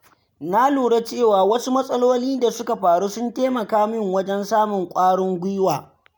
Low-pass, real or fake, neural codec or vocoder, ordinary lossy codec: none; real; none; none